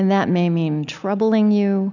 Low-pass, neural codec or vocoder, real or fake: 7.2 kHz; none; real